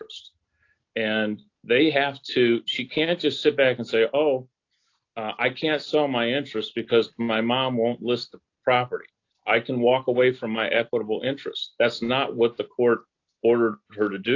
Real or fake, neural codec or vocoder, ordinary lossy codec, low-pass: real; none; AAC, 48 kbps; 7.2 kHz